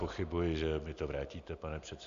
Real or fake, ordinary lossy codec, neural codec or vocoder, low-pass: real; Opus, 64 kbps; none; 7.2 kHz